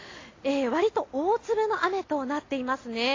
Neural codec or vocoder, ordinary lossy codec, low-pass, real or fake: none; AAC, 32 kbps; 7.2 kHz; real